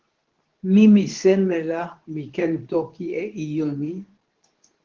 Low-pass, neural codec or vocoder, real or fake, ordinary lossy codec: 7.2 kHz; codec, 24 kHz, 0.9 kbps, WavTokenizer, medium speech release version 2; fake; Opus, 16 kbps